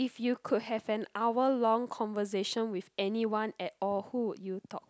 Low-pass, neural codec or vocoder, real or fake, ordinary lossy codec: none; none; real; none